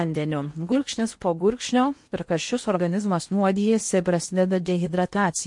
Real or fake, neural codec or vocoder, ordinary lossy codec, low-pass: fake; codec, 16 kHz in and 24 kHz out, 0.8 kbps, FocalCodec, streaming, 65536 codes; MP3, 48 kbps; 10.8 kHz